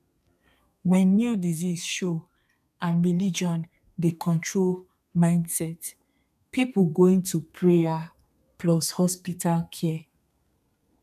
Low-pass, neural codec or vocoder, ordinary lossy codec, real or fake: 14.4 kHz; codec, 32 kHz, 1.9 kbps, SNAC; none; fake